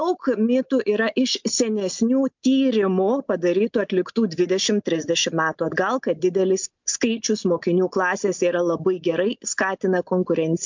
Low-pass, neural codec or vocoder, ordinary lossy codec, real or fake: 7.2 kHz; none; MP3, 64 kbps; real